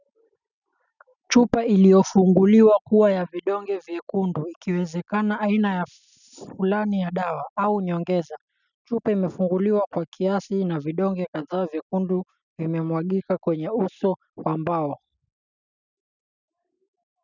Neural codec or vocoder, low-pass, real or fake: none; 7.2 kHz; real